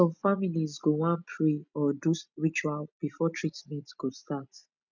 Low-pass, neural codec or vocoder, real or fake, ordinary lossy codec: 7.2 kHz; none; real; none